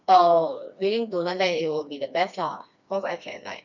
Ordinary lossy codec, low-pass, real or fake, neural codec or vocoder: none; 7.2 kHz; fake; codec, 16 kHz, 2 kbps, FreqCodec, smaller model